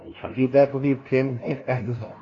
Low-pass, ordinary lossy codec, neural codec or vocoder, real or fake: 7.2 kHz; AAC, 32 kbps; codec, 16 kHz, 0.5 kbps, FunCodec, trained on LibriTTS, 25 frames a second; fake